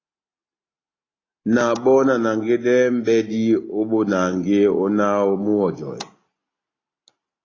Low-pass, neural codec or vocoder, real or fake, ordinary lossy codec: 7.2 kHz; none; real; AAC, 32 kbps